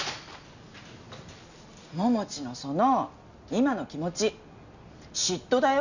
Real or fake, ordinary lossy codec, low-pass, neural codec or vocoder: real; none; 7.2 kHz; none